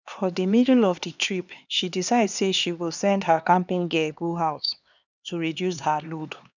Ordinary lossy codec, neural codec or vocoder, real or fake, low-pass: none; codec, 16 kHz, 2 kbps, X-Codec, HuBERT features, trained on LibriSpeech; fake; 7.2 kHz